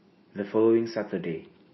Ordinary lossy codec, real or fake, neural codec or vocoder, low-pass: MP3, 24 kbps; real; none; 7.2 kHz